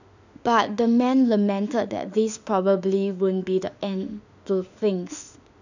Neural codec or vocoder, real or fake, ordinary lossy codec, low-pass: autoencoder, 48 kHz, 32 numbers a frame, DAC-VAE, trained on Japanese speech; fake; none; 7.2 kHz